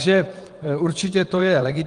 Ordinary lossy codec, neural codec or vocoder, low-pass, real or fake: Opus, 24 kbps; vocoder, 22.05 kHz, 80 mel bands, WaveNeXt; 9.9 kHz; fake